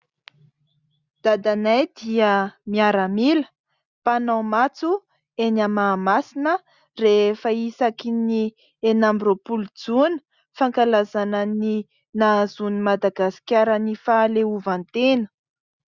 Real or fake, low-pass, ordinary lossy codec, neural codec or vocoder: real; 7.2 kHz; Opus, 64 kbps; none